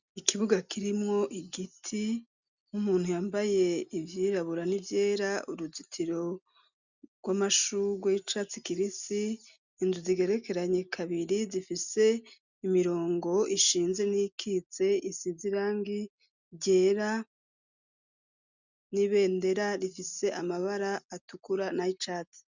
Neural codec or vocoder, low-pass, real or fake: none; 7.2 kHz; real